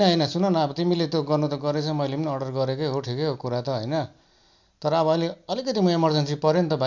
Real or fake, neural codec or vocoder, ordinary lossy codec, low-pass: real; none; none; 7.2 kHz